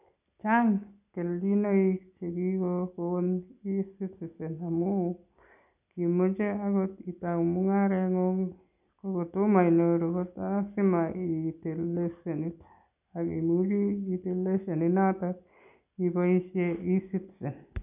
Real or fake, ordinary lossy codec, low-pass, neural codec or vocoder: real; none; 3.6 kHz; none